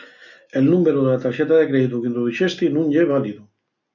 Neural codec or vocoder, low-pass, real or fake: none; 7.2 kHz; real